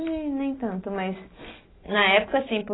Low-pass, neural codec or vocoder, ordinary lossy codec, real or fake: 7.2 kHz; none; AAC, 16 kbps; real